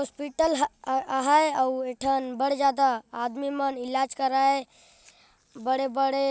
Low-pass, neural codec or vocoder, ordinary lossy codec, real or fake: none; none; none; real